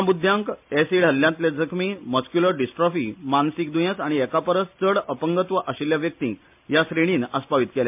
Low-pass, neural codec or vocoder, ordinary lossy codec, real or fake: 3.6 kHz; none; MP3, 32 kbps; real